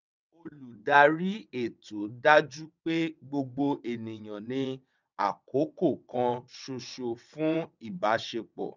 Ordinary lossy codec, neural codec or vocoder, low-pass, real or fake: none; vocoder, 22.05 kHz, 80 mel bands, WaveNeXt; 7.2 kHz; fake